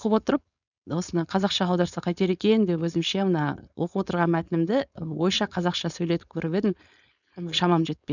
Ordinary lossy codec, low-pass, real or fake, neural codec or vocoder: none; 7.2 kHz; fake; codec, 16 kHz, 4.8 kbps, FACodec